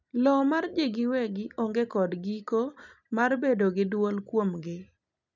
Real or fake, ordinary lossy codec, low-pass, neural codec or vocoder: real; none; 7.2 kHz; none